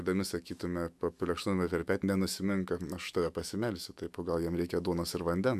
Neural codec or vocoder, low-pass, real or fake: autoencoder, 48 kHz, 128 numbers a frame, DAC-VAE, trained on Japanese speech; 14.4 kHz; fake